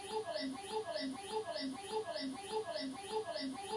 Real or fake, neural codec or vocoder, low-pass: real; none; 10.8 kHz